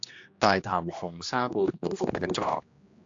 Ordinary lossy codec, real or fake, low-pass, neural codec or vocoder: AAC, 48 kbps; fake; 7.2 kHz; codec, 16 kHz, 1 kbps, X-Codec, HuBERT features, trained on general audio